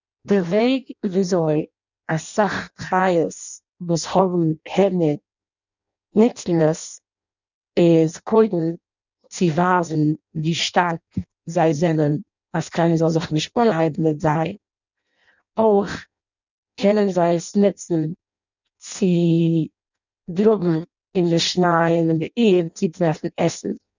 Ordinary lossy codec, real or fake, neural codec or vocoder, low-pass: none; fake; codec, 16 kHz in and 24 kHz out, 0.6 kbps, FireRedTTS-2 codec; 7.2 kHz